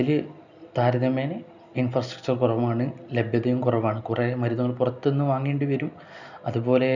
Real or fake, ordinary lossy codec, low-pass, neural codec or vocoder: real; none; 7.2 kHz; none